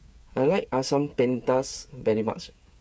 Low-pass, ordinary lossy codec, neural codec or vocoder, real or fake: none; none; codec, 16 kHz, 8 kbps, FreqCodec, smaller model; fake